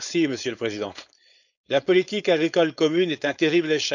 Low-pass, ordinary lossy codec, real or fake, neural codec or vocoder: 7.2 kHz; none; fake; codec, 16 kHz, 4.8 kbps, FACodec